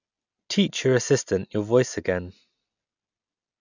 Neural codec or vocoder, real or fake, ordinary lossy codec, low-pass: none; real; none; 7.2 kHz